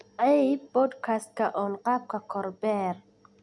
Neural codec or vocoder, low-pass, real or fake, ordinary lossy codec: none; 10.8 kHz; real; none